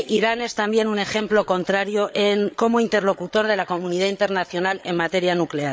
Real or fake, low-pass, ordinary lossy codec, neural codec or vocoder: fake; none; none; codec, 16 kHz, 8 kbps, FreqCodec, larger model